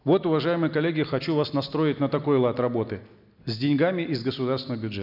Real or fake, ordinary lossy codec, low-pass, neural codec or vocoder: real; none; 5.4 kHz; none